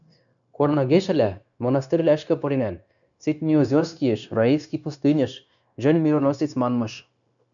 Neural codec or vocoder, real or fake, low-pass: codec, 16 kHz, 0.9 kbps, LongCat-Audio-Codec; fake; 7.2 kHz